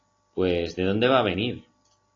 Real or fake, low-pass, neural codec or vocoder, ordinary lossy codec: real; 7.2 kHz; none; AAC, 32 kbps